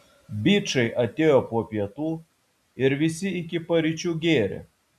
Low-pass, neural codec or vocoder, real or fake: 14.4 kHz; none; real